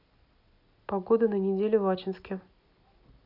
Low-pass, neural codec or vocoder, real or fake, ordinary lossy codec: 5.4 kHz; none; real; none